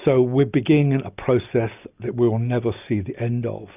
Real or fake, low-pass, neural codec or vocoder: real; 3.6 kHz; none